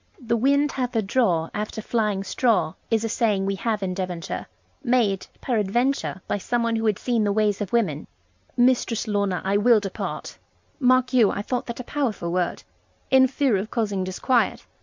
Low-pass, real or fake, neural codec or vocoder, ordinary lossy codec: 7.2 kHz; real; none; MP3, 64 kbps